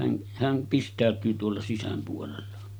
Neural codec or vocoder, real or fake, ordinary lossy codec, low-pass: none; real; none; none